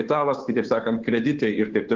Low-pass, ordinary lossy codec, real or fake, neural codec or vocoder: 7.2 kHz; Opus, 16 kbps; fake; codec, 16 kHz, 2 kbps, FunCodec, trained on Chinese and English, 25 frames a second